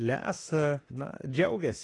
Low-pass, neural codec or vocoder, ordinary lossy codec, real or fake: 10.8 kHz; autoencoder, 48 kHz, 32 numbers a frame, DAC-VAE, trained on Japanese speech; AAC, 32 kbps; fake